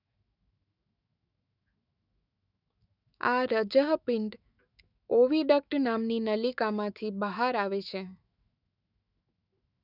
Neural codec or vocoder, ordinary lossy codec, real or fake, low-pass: autoencoder, 48 kHz, 128 numbers a frame, DAC-VAE, trained on Japanese speech; none; fake; 5.4 kHz